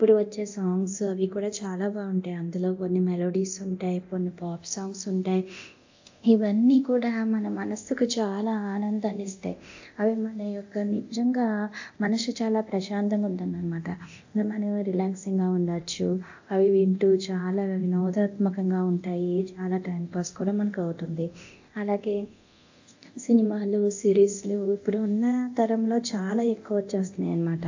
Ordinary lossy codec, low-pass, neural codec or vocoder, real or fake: AAC, 48 kbps; 7.2 kHz; codec, 24 kHz, 0.9 kbps, DualCodec; fake